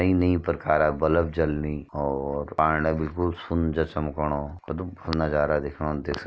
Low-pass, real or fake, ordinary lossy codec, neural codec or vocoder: none; real; none; none